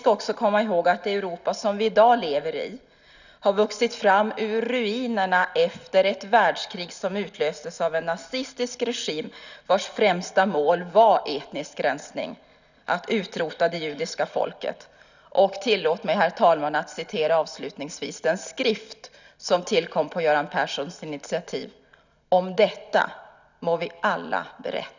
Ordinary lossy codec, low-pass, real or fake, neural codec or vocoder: none; 7.2 kHz; real; none